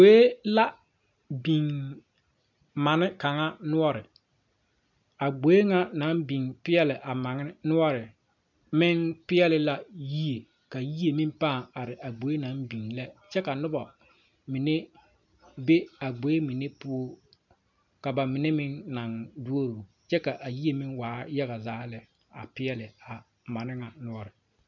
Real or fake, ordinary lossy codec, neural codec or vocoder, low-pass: real; MP3, 64 kbps; none; 7.2 kHz